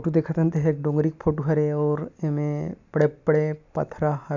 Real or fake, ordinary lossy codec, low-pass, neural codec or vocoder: real; none; 7.2 kHz; none